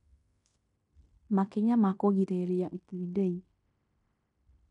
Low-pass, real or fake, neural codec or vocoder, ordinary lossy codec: 10.8 kHz; fake; codec, 16 kHz in and 24 kHz out, 0.9 kbps, LongCat-Audio-Codec, fine tuned four codebook decoder; none